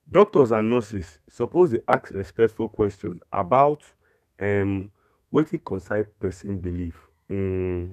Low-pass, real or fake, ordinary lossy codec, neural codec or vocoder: 14.4 kHz; fake; none; codec, 32 kHz, 1.9 kbps, SNAC